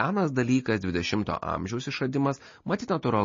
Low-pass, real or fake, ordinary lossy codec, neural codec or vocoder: 7.2 kHz; real; MP3, 32 kbps; none